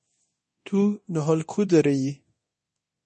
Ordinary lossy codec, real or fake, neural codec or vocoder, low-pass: MP3, 32 kbps; fake; codec, 24 kHz, 0.9 kbps, DualCodec; 10.8 kHz